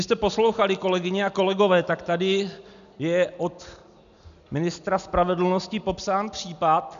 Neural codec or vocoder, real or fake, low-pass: none; real; 7.2 kHz